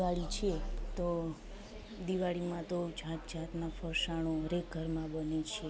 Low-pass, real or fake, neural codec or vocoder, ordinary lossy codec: none; real; none; none